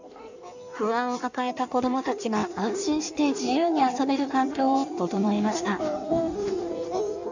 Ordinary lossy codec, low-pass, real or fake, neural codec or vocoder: none; 7.2 kHz; fake; codec, 16 kHz in and 24 kHz out, 1.1 kbps, FireRedTTS-2 codec